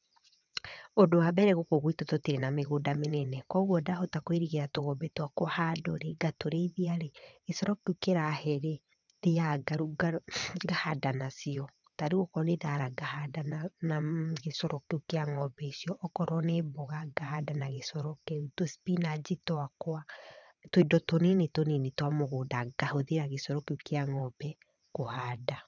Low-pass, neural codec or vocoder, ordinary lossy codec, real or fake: 7.2 kHz; vocoder, 22.05 kHz, 80 mel bands, WaveNeXt; none; fake